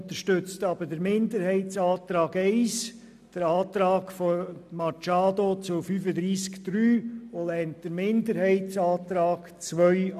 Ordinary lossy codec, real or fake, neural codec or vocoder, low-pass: none; real; none; 14.4 kHz